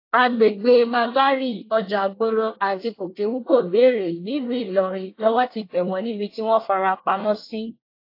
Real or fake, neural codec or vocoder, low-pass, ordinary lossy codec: fake; codec, 24 kHz, 1 kbps, SNAC; 5.4 kHz; AAC, 32 kbps